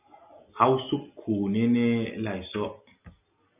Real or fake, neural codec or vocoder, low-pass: real; none; 3.6 kHz